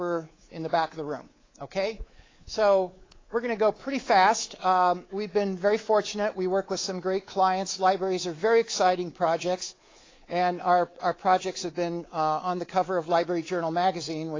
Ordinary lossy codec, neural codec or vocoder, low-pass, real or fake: AAC, 32 kbps; codec, 24 kHz, 3.1 kbps, DualCodec; 7.2 kHz; fake